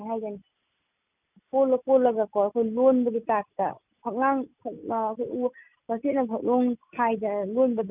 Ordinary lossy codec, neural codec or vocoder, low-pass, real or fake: none; none; 3.6 kHz; real